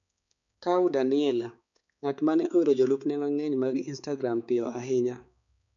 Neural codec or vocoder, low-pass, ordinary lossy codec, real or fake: codec, 16 kHz, 4 kbps, X-Codec, HuBERT features, trained on balanced general audio; 7.2 kHz; none; fake